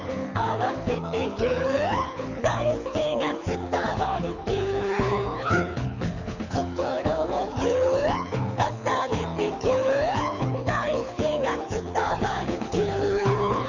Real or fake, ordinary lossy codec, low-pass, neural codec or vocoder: fake; none; 7.2 kHz; codec, 24 kHz, 6 kbps, HILCodec